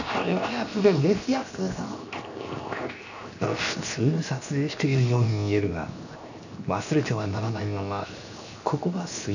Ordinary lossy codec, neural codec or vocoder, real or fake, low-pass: none; codec, 16 kHz, 0.7 kbps, FocalCodec; fake; 7.2 kHz